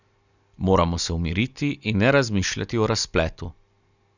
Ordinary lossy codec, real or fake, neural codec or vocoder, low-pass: none; real; none; 7.2 kHz